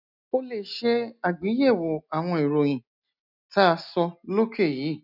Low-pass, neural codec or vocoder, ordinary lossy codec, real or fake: 5.4 kHz; none; none; real